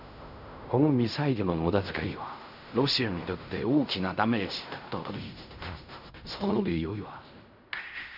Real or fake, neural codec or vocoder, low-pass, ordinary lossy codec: fake; codec, 16 kHz in and 24 kHz out, 0.4 kbps, LongCat-Audio-Codec, fine tuned four codebook decoder; 5.4 kHz; none